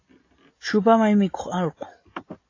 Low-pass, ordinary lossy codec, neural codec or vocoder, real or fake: 7.2 kHz; AAC, 32 kbps; none; real